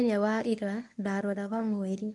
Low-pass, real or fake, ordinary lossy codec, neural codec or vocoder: none; fake; none; codec, 24 kHz, 0.9 kbps, WavTokenizer, medium speech release version 1